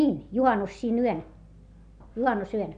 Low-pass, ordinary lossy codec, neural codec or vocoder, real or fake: 9.9 kHz; AAC, 96 kbps; none; real